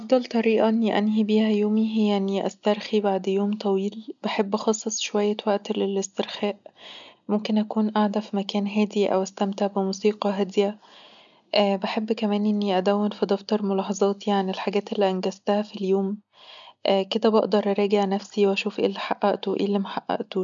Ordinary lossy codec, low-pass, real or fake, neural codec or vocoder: none; 7.2 kHz; real; none